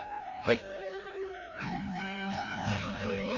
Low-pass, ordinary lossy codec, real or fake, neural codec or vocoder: 7.2 kHz; MP3, 48 kbps; fake; codec, 16 kHz, 1 kbps, FreqCodec, larger model